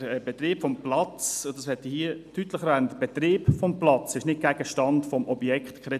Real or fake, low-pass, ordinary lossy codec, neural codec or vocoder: real; 14.4 kHz; none; none